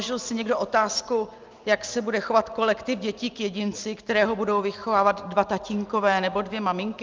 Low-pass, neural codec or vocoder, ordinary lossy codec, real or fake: 7.2 kHz; none; Opus, 32 kbps; real